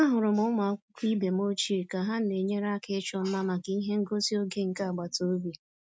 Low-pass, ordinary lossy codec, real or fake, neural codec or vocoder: none; none; real; none